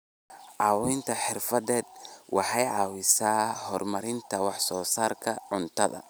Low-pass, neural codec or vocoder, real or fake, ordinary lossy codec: none; none; real; none